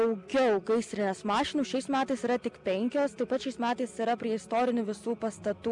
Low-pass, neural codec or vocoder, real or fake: 10.8 kHz; none; real